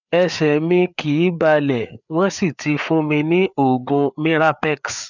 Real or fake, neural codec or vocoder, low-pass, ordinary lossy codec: fake; codec, 16 kHz, 4 kbps, FreqCodec, larger model; 7.2 kHz; none